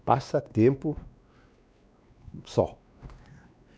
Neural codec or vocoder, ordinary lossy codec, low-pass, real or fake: codec, 16 kHz, 2 kbps, X-Codec, WavLM features, trained on Multilingual LibriSpeech; none; none; fake